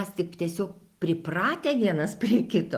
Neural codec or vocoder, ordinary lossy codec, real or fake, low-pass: none; Opus, 24 kbps; real; 14.4 kHz